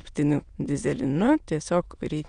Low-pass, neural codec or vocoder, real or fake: 9.9 kHz; autoencoder, 22.05 kHz, a latent of 192 numbers a frame, VITS, trained on many speakers; fake